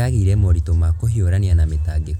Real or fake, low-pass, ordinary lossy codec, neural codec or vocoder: real; 19.8 kHz; none; none